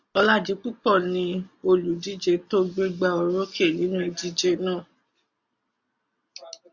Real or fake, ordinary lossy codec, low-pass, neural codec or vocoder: real; Opus, 64 kbps; 7.2 kHz; none